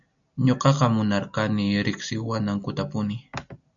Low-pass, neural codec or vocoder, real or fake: 7.2 kHz; none; real